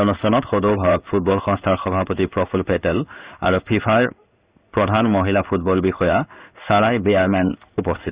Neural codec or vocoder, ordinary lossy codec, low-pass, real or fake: codec, 16 kHz in and 24 kHz out, 1 kbps, XY-Tokenizer; Opus, 64 kbps; 3.6 kHz; fake